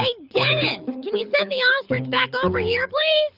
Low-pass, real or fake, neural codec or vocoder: 5.4 kHz; fake; codec, 24 kHz, 6 kbps, HILCodec